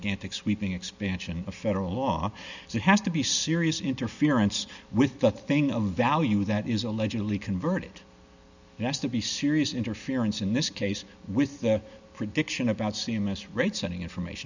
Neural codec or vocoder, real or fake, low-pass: none; real; 7.2 kHz